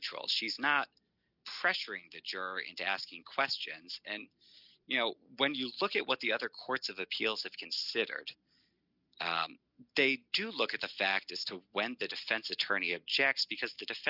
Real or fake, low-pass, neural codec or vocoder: real; 5.4 kHz; none